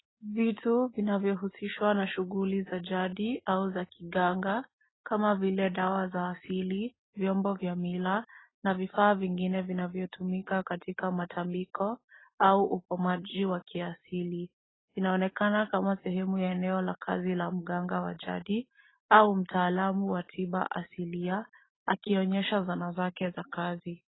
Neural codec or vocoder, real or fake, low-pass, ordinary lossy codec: none; real; 7.2 kHz; AAC, 16 kbps